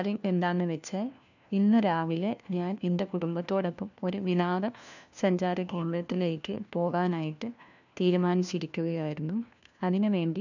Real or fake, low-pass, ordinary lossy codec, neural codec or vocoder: fake; 7.2 kHz; none; codec, 16 kHz, 1 kbps, FunCodec, trained on LibriTTS, 50 frames a second